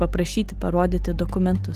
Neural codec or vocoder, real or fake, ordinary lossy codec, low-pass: none; real; Opus, 24 kbps; 14.4 kHz